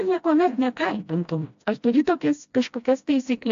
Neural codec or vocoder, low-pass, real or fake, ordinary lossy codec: codec, 16 kHz, 1 kbps, FreqCodec, smaller model; 7.2 kHz; fake; AAC, 64 kbps